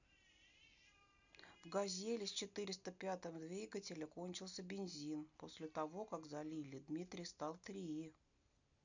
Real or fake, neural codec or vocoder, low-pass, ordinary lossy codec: real; none; 7.2 kHz; none